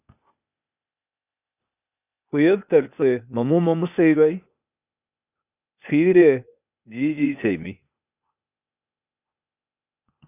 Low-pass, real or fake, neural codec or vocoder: 3.6 kHz; fake; codec, 16 kHz, 0.8 kbps, ZipCodec